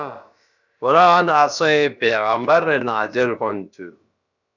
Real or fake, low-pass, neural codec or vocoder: fake; 7.2 kHz; codec, 16 kHz, about 1 kbps, DyCAST, with the encoder's durations